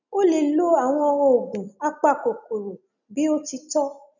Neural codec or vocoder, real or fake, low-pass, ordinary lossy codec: none; real; 7.2 kHz; none